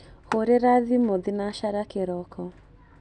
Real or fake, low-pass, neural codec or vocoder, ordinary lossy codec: real; 10.8 kHz; none; none